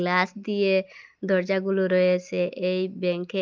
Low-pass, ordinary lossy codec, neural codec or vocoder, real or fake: 7.2 kHz; Opus, 32 kbps; none; real